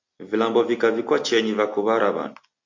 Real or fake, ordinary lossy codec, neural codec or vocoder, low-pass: real; MP3, 48 kbps; none; 7.2 kHz